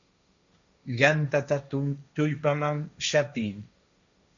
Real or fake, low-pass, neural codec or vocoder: fake; 7.2 kHz; codec, 16 kHz, 1.1 kbps, Voila-Tokenizer